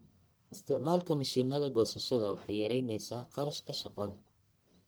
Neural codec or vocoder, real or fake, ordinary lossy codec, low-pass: codec, 44.1 kHz, 1.7 kbps, Pupu-Codec; fake; none; none